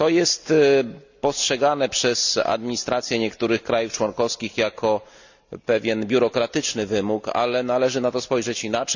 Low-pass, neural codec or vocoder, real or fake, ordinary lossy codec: 7.2 kHz; none; real; none